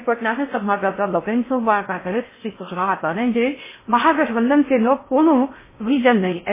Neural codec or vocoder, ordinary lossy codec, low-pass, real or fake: codec, 16 kHz in and 24 kHz out, 0.6 kbps, FocalCodec, streaming, 4096 codes; MP3, 16 kbps; 3.6 kHz; fake